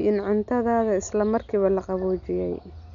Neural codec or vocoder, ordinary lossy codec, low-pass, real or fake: none; none; 7.2 kHz; real